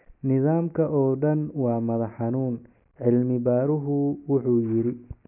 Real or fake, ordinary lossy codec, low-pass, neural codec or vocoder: real; none; 3.6 kHz; none